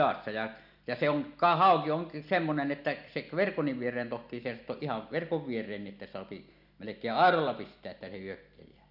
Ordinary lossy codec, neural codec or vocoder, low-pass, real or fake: none; none; 5.4 kHz; real